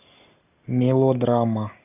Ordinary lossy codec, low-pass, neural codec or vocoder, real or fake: AAC, 32 kbps; 3.6 kHz; none; real